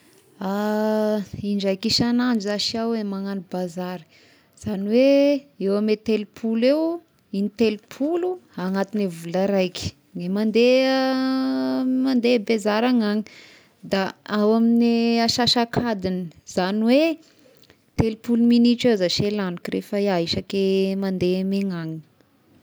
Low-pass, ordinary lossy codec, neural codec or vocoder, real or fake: none; none; none; real